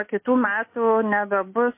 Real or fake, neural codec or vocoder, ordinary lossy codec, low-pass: real; none; MP3, 24 kbps; 3.6 kHz